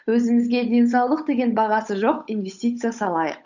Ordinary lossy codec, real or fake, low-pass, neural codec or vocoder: none; fake; 7.2 kHz; codec, 16 kHz, 8 kbps, FunCodec, trained on Chinese and English, 25 frames a second